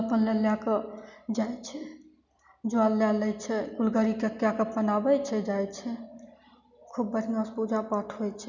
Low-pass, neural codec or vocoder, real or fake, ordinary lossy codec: 7.2 kHz; none; real; none